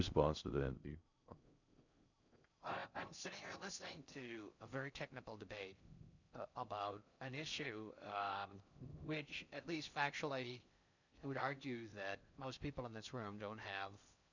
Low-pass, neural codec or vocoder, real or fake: 7.2 kHz; codec, 16 kHz in and 24 kHz out, 0.6 kbps, FocalCodec, streaming, 4096 codes; fake